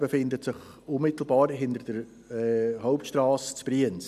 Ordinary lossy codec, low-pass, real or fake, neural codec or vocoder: none; 14.4 kHz; real; none